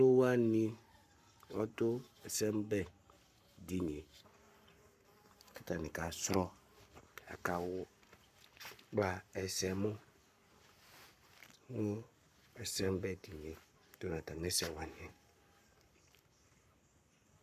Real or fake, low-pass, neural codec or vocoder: fake; 14.4 kHz; codec, 44.1 kHz, 7.8 kbps, Pupu-Codec